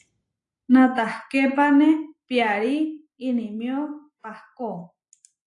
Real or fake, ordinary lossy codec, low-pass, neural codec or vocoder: real; AAC, 48 kbps; 10.8 kHz; none